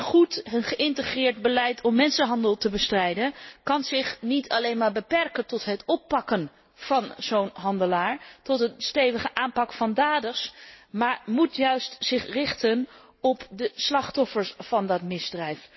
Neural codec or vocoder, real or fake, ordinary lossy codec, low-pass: none; real; MP3, 24 kbps; 7.2 kHz